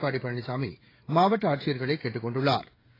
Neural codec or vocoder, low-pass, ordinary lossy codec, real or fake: codec, 16 kHz, 16 kbps, FreqCodec, smaller model; 5.4 kHz; AAC, 24 kbps; fake